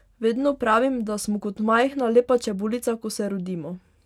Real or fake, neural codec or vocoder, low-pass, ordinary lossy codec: real; none; 19.8 kHz; none